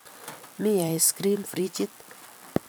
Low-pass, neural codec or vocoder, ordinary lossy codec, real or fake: none; vocoder, 44.1 kHz, 128 mel bands every 256 samples, BigVGAN v2; none; fake